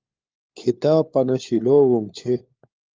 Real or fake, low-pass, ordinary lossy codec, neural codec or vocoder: fake; 7.2 kHz; Opus, 32 kbps; codec, 16 kHz, 4 kbps, FunCodec, trained on LibriTTS, 50 frames a second